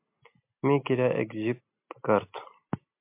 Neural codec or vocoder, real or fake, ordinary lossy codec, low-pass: none; real; MP3, 32 kbps; 3.6 kHz